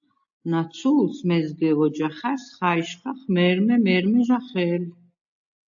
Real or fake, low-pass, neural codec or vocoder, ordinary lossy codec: real; 7.2 kHz; none; AAC, 64 kbps